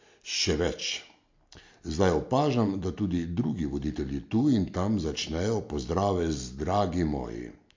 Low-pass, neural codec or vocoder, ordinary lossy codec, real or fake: 7.2 kHz; none; MP3, 48 kbps; real